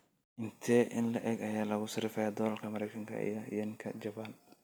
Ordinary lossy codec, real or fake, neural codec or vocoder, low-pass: none; real; none; none